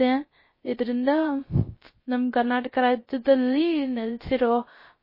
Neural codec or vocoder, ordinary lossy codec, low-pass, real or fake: codec, 16 kHz, 0.3 kbps, FocalCodec; MP3, 24 kbps; 5.4 kHz; fake